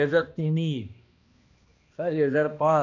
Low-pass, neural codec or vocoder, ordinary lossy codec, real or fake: 7.2 kHz; codec, 16 kHz, 1 kbps, X-Codec, HuBERT features, trained on balanced general audio; none; fake